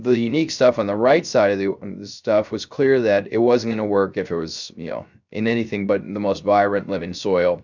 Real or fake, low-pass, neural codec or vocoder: fake; 7.2 kHz; codec, 16 kHz, 0.3 kbps, FocalCodec